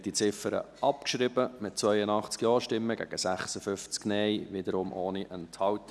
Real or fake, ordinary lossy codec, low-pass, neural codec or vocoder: real; none; none; none